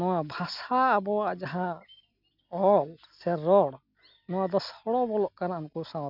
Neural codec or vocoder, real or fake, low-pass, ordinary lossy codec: none; real; 5.4 kHz; none